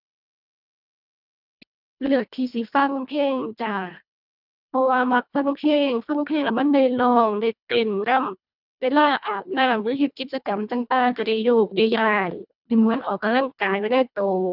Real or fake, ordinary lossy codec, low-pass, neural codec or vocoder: fake; none; 5.4 kHz; codec, 24 kHz, 1.5 kbps, HILCodec